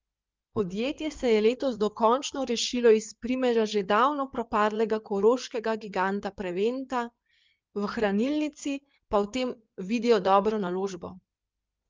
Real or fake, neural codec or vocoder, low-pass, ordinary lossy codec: fake; codec, 16 kHz in and 24 kHz out, 2.2 kbps, FireRedTTS-2 codec; 7.2 kHz; Opus, 32 kbps